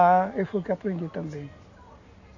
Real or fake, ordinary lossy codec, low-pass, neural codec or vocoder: real; none; 7.2 kHz; none